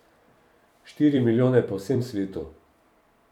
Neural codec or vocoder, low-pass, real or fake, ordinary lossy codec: vocoder, 44.1 kHz, 128 mel bands every 256 samples, BigVGAN v2; 19.8 kHz; fake; none